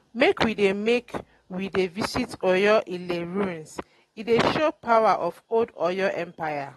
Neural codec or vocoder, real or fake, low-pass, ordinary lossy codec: none; real; 19.8 kHz; AAC, 32 kbps